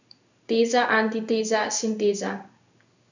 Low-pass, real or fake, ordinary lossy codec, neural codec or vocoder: 7.2 kHz; fake; none; codec, 16 kHz in and 24 kHz out, 1 kbps, XY-Tokenizer